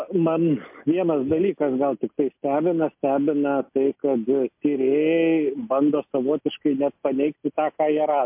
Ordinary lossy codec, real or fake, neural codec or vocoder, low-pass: MP3, 32 kbps; real; none; 3.6 kHz